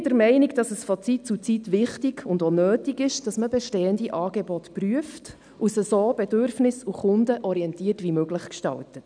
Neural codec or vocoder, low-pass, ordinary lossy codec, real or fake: none; 9.9 kHz; none; real